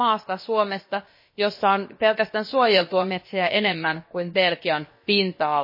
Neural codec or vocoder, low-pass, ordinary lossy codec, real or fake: codec, 16 kHz, about 1 kbps, DyCAST, with the encoder's durations; 5.4 kHz; MP3, 24 kbps; fake